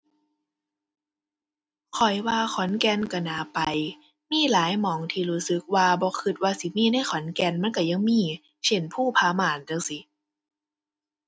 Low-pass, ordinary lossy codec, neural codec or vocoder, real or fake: none; none; none; real